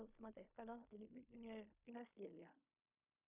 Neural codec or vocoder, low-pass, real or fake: codec, 16 kHz in and 24 kHz out, 0.4 kbps, LongCat-Audio-Codec, fine tuned four codebook decoder; 3.6 kHz; fake